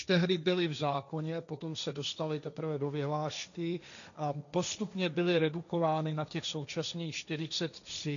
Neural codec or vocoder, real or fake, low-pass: codec, 16 kHz, 1.1 kbps, Voila-Tokenizer; fake; 7.2 kHz